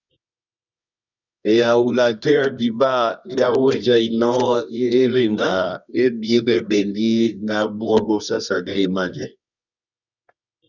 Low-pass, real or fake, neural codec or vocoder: 7.2 kHz; fake; codec, 24 kHz, 0.9 kbps, WavTokenizer, medium music audio release